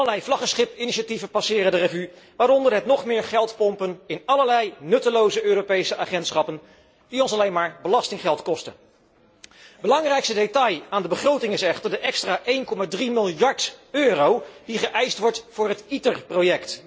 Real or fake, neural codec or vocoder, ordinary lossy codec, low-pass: real; none; none; none